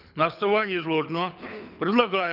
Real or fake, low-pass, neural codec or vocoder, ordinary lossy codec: fake; 5.4 kHz; codec, 24 kHz, 6 kbps, HILCodec; none